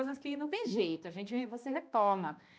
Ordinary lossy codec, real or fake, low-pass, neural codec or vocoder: none; fake; none; codec, 16 kHz, 1 kbps, X-Codec, HuBERT features, trained on balanced general audio